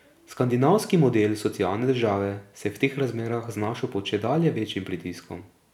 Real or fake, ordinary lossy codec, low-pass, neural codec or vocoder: real; none; 19.8 kHz; none